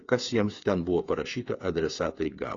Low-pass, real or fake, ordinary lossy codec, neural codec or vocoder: 7.2 kHz; fake; AAC, 32 kbps; codec, 16 kHz, 8 kbps, FreqCodec, smaller model